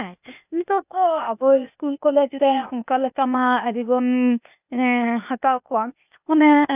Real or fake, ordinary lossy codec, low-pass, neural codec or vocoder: fake; none; 3.6 kHz; codec, 16 kHz, 0.8 kbps, ZipCodec